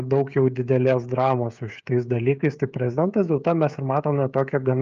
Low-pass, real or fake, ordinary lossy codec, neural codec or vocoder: 7.2 kHz; fake; Opus, 24 kbps; codec, 16 kHz, 16 kbps, FreqCodec, smaller model